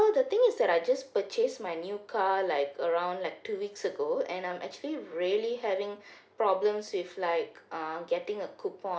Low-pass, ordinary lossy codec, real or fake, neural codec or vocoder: none; none; real; none